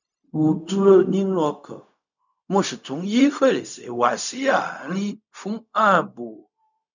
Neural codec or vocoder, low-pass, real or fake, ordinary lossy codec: codec, 16 kHz, 0.4 kbps, LongCat-Audio-Codec; 7.2 kHz; fake; none